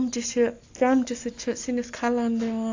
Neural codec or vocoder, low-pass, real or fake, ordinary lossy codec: none; 7.2 kHz; real; AAC, 48 kbps